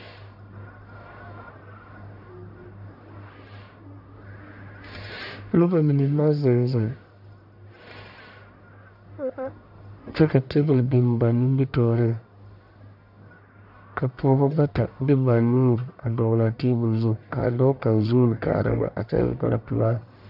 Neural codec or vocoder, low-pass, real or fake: codec, 44.1 kHz, 1.7 kbps, Pupu-Codec; 5.4 kHz; fake